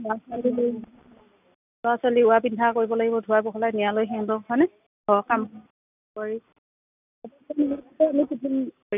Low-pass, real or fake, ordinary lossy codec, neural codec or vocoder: 3.6 kHz; real; none; none